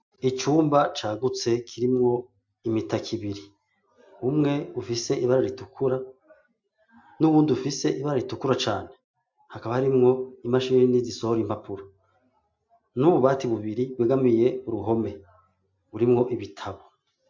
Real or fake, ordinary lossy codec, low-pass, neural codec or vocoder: real; MP3, 64 kbps; 7.2 kHz; none